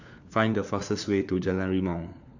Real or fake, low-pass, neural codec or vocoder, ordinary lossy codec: fake; 7.2 kHz; codec, 16 kHz, 4 kbps, FunCodec, trained on LibriTTS, 50 frames a second; none